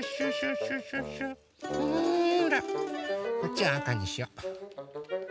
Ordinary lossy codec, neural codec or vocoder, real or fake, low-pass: none; none; real; none